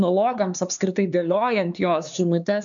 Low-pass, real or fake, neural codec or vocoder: 7.2 kHz; fake; codec, 16 kHz, 4 kbps, X-Codec, HuBERT features, trained on LibriSpeech